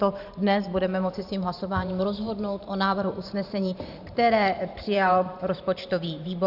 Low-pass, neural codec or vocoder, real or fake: 5.4 kHz; vocoder, 44.1 kHz, 128 mel bands every 512 samples, BigVGAN v2; fake